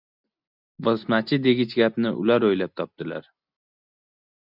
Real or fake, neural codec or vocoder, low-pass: real; none; 5.4 kHz